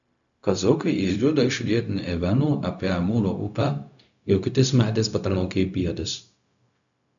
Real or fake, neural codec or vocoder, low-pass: fake; codec, 16 kHz, 0.4 kbps, LongCat-Audio-Codec; 7.2 kHz